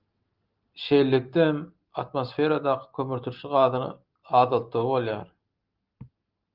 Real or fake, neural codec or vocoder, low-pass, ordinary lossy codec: real; none; 5.4 kHz; Opus, 32 kbps